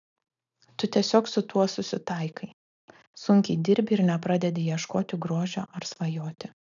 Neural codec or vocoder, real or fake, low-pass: none; real; 7.2 kHz